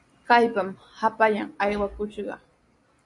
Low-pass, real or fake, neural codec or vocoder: 10.8 kHz; real; none